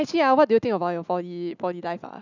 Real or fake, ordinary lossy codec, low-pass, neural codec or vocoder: real; none; 7.2 kHz; none